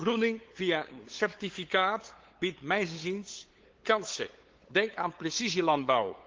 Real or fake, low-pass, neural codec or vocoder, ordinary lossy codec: fake; 7.2 kHz; codec, 16 kHz, 8 kbps, FunCodec, trained on LibriTTS, 25 frames a second; Opus, 16 kbps